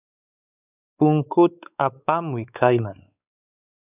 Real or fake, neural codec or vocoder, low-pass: fake; codec, 16 kHz, 4 kbps, X-Codec, WavLM features, trained on Multilingual LibriSpeech; 3.6 kHz